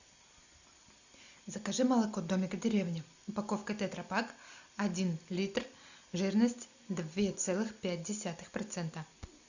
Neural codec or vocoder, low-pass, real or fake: none; 7.2 kHz; real